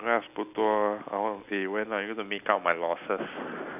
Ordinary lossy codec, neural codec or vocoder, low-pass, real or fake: none; none; 3.6 kHz; real